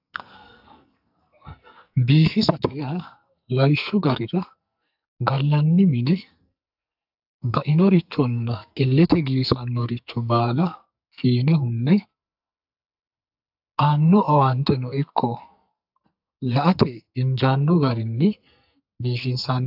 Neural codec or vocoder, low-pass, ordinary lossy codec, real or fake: codec, 44.1 kHz, 2.6 kbps, SNAC; 5.4 kHz; AAC, 48 kbps; fake